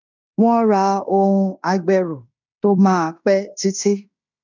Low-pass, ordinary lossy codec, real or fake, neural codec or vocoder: 7.2 kHz; none; fake; codec, 16 kHz in and 24 kHz out, 0.9 kbps, LongCat-Audio-Codec, fine tuned four codebook decoder